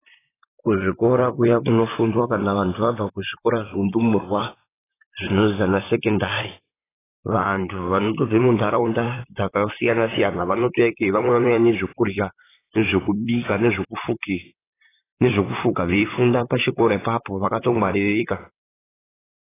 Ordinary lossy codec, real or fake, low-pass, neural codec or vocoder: AAC, 16 kbps; fake; 3.6 kHz; vocoder, 44.1 kHz, 128 mel bands, Pupu-Vocoder